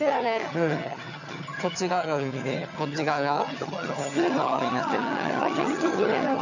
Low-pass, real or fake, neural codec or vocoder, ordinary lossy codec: 7.2 kHz; fake; vocoder, 22.05 kHz, 80 mel bands, HiFi-GAN; none